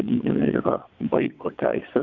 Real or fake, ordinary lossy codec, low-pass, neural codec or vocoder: fake; Opus, 64 kbps; 7.2 kHz; vocoder, 22.05 kHz, 80 mel bands, WaveNeXt